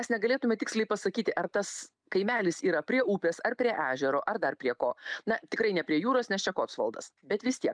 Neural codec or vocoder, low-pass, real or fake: none; 9.9 kHz; real